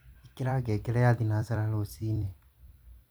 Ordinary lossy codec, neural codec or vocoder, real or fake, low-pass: none; vocoder, 44.1 kHz, 128 mel bands every 256 samples, BigVGAN v2; fake; none